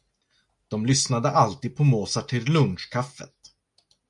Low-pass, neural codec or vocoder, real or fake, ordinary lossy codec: 10.8 kHz; none; real; MP3, 96 kbps